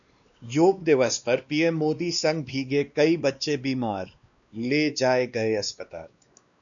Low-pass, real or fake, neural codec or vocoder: 7.2 kHz; fake; codec, 16 kHz, 2 kbps, X-Codec, WavLM features, trained on Multilingual LibriSpeech